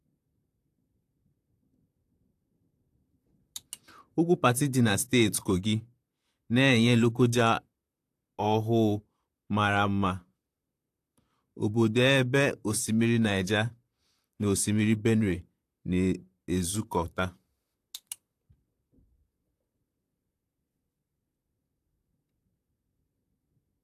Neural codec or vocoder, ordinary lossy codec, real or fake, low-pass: vocoder, 44.1 kHz, 128 mel bands, Pupu-Vocoder; AAC, 64 kbps; fake; 14.4 kHz